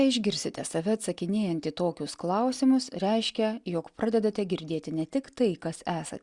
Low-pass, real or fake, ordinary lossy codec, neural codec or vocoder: 10.8 kHz; real; Opus, 64 kbps; none